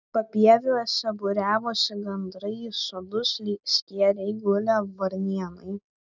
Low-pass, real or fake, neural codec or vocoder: 7.2 kHz; real; none